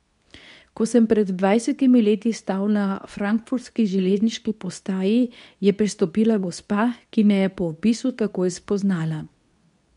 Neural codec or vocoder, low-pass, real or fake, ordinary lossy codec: codec, 24 kHz, 0.9 kbps, WavTokenizer, medium speech release version 2; 10.8 kHz; fake; none